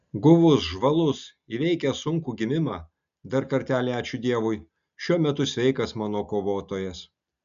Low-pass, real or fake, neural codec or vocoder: 7.2 kHz; real; none